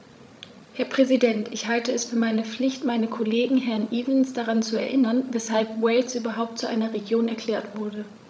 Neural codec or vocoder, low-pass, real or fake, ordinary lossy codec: codec, 16 kHz, 16 kbps, FreqCodec, larger model; none; fake; none